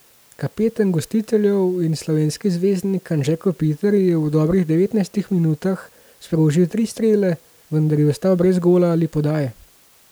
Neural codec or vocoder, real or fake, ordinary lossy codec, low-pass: none; real; none; none